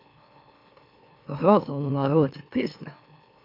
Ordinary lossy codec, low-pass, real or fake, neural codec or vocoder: MP3, 48 kbps; 5.4 kHz; fake; autoencoder, 44.1 kHz, a latent of 192 numbers a frame, MeloTTS